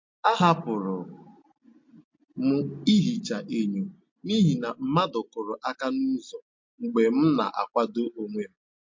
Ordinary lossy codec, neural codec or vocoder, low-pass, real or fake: MP3, 48 kbps; none; 7.2 kHz; real